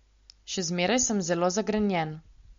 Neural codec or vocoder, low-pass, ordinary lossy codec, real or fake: none; 7.2 kHz; MP3, 48 kbps; real